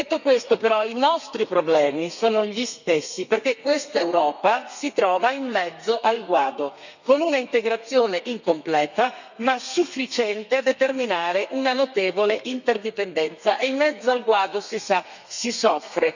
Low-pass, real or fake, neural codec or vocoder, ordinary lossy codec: 7.2 kHz; fake; codec, 32 kHz, 1.9 kbps, SNAC; none